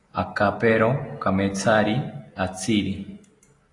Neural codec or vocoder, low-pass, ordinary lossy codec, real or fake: none; 10.8 kHz; AAC, 32 kbps; real